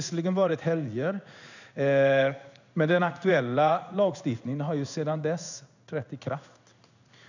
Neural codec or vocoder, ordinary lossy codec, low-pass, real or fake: codec, 16 kHz in and 24 kHz out, 1 kbps, XY-Tokenizer; none; 7.2 kHz; fake